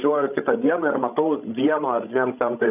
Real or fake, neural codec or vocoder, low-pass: fake; codec, 16 kHz, 16 kbps, FreqCodec, larger model; 3.6 kHz